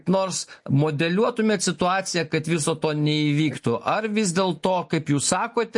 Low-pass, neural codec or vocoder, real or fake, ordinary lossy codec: 10.8 kHz; none; real; MP3, 48 kbps